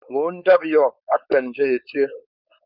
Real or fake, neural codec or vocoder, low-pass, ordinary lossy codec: fake; codec, 16 kHz, 4.8 kbps, FACodec; 5.4 kHz; Opus, 64 kbps